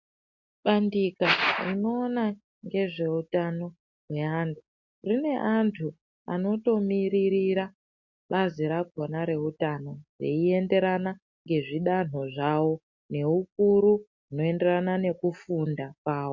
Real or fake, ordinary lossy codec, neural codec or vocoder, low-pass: real; MP3, 48 kbps; none; 7.2 kHz